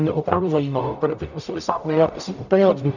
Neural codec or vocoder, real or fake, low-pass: codec, 44.1 kHz, 0.9 kbps, DAC; fake; 7.2 kHz